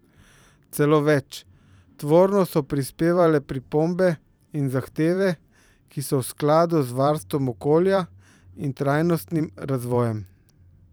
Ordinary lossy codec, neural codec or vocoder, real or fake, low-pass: none; vocoder, 44.1 kHz, 128 mel bands every 512 samples, BigVGAN v2; fake; none